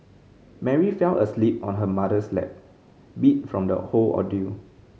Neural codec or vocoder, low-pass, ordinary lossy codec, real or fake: none; none; none; real